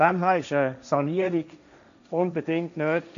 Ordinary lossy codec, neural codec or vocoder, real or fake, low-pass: AAC, 96 kbps; codec, 16 kHz, 1.1 kbps, Voila-Tokenizer; fake; 7.2 kHz